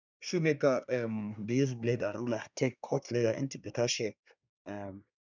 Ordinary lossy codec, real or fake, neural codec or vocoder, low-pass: none; fake; codec, 24 kHz, 1 kbps, SNAC; 7.2 kHz